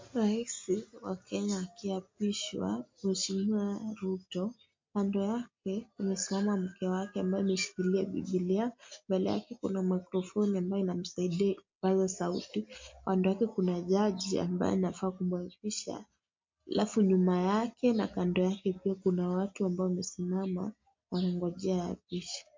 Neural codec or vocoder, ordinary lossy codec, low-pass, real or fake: none; MP3, 48 kbps; 7.2 kHz; real